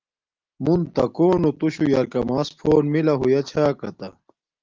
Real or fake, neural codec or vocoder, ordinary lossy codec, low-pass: real; none; Opus, 32 kbps; 7.2 kHz